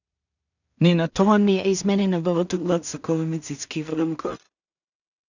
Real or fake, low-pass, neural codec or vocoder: fake; 7.2 kHz; codec, 16 kHz in and 24 kHz out, 0.4 kbps, LongCat-Audio-Codec, two codebook decoder